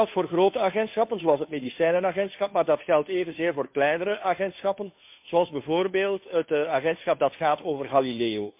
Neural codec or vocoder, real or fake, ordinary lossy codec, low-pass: codec, 16 kHz, 2 kbps, FunCodec, trained on Chinese and English, 25 frames a second; fake; MP3, 32 kbps; 3.6 kHz